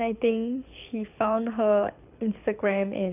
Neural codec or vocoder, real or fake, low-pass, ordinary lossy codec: codec, 24 kHz, 6 kbps, HILCodec; fake; 3.6 kHz; none